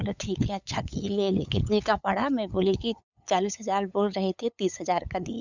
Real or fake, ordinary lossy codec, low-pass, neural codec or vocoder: fake; none; 7.2 kHz; codec, 16 kHz, 8 kbps, FunCodec, trained on LibriTTS, 25 frames a second